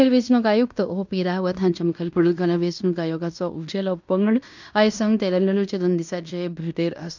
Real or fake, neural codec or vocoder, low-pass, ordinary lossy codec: fake; codec, 16 kHz in and 24 kHz out, 0.9 kbps, LongCat-Audio-Codec, fine tuned four codebook decoder; 7.2 kHz; none